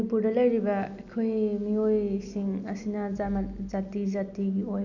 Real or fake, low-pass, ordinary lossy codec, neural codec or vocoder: real; 7.2 kHz; none; none